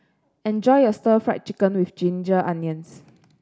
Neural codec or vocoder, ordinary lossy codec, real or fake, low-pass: none; none; real; none